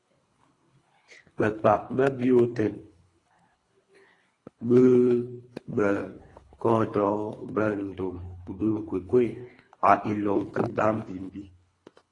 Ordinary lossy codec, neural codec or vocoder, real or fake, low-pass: AAC, 32 kbps; codec, 24 kHz, 3 kbps, HILCodec; fake; 10.8 kHz